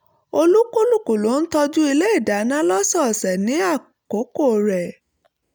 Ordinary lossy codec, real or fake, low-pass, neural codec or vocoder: none; real; none; none